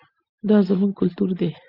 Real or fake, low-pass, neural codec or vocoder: real; 5.4 kHz; none